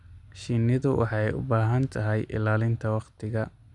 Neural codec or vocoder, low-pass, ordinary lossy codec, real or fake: none; 10.8 kHz; none; real